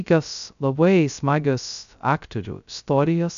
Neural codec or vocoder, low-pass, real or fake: codec, 16 kHz, 0.2 kbps, FocalCodec; 7.2 kHz; fake